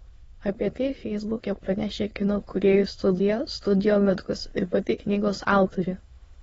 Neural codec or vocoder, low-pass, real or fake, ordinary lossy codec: autoencoder, 22.05 kHz, a latent of 192 numbers a frame, VITS, trained on many speakers; 9.9 kHz; fake; AAC, 24 kbps